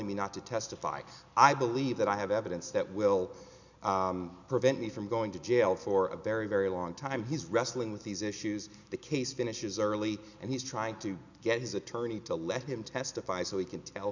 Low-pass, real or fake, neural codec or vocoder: 7.2 kHz; real; none